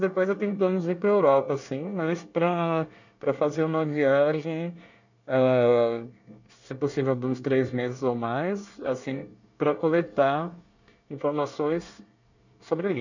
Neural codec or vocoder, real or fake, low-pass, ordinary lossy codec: codec, 24 kHz, 1 kbps, SNAC; fake; 7.2 kHz; none